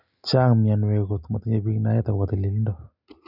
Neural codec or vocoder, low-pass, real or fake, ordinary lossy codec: none; 5.4 kHz; real; none